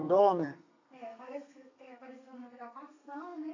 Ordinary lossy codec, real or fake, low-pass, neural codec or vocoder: AAC, 48 kbps; fake; 7.2 kHz; codec, 32 kHz, 1.9 kbps, SNAC